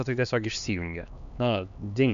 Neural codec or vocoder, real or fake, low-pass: codec, 16 kHz, 2 kbps, X-Codec, HuBERT features, trained on LibriSpeech; fake; 7.2 kHz